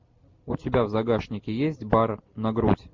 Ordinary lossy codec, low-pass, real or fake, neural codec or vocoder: MP3, 64 kbps; 7.2 kHz; real; none